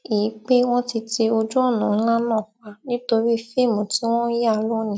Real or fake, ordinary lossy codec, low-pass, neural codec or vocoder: real; none; none; none